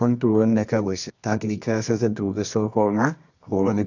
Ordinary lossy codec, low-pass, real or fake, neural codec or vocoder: none; 7.2 kHz; fake; codec, 24 kHz, 0.9 kbps, WavTokenizer, medium music audio release